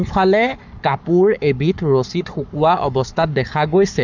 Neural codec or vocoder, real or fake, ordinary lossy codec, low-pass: codec, 44.1 kHz, 7.8 kbps, Pupu-Codec; fake; none; 7.2 kHz